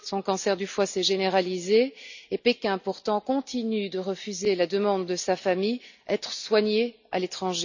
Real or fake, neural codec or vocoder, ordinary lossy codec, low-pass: real; none; none; 7.2 kHz